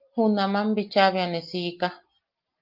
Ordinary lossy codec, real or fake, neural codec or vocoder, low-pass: Opus, 32 kbps; real; none; 5.4 kHz